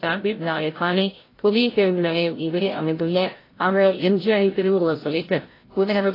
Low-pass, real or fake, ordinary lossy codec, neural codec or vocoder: 5.4 kHz; fake; AAC, 24 kbps; codec, 16 kHz, 0.5 kbps, FreqCodec, larger model